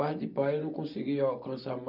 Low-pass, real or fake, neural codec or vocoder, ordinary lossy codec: 5.4 kHz; real; none; none